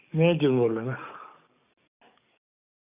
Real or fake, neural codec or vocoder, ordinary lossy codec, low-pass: fake; codec, 44.1 kHz, 7.8 kbps, DAC; none; 3.6 kHz